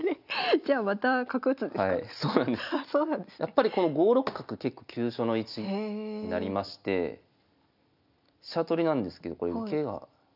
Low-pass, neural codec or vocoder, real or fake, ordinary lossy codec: 5.4 kHz; none; real; none